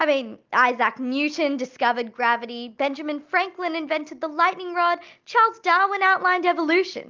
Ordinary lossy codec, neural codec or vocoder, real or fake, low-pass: Opus, 24 kbps; none; real; 7.2 kHz